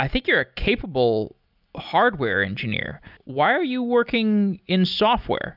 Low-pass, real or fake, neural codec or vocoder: 5.4 kHz; real; none